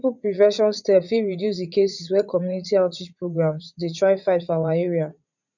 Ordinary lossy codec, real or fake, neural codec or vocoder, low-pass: none; fake; vocoder, 44.1 kHz, 80 mel bands, Vocos; 7.2 kHz